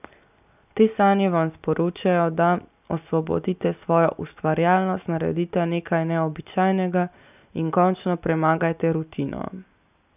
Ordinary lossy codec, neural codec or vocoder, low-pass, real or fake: none; none; 3.6 kHz; real